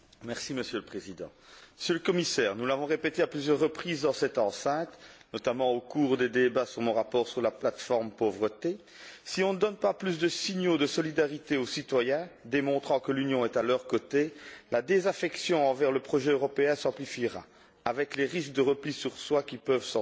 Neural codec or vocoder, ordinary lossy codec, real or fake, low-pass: none; none; real; none